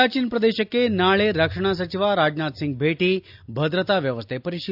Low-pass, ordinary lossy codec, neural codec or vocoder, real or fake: 5.4 kHz; AAC, 48 kbps; none; real